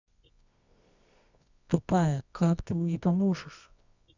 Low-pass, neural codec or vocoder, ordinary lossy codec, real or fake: 7.2 kHz; codec, 24 kHz, 0.9 kbps, WavTokenizer, medium music audio release; none; fake